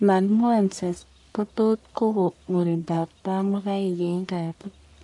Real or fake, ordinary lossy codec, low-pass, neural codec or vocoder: fake; AAC, 64 kbps; 10.8 kHz; codec, 44.1 kHz, 1.7 kbps, Pupu-Codec